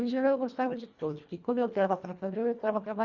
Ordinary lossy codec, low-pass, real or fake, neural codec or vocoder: Opus, 64 kbps; 7.2 kHz; fake; codec, 24 kHz, 1.5 kbps, HILCodec